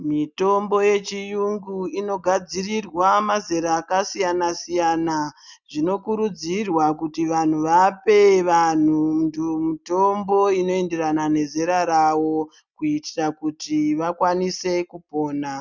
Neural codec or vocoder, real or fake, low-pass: none; real; 7.2 kHz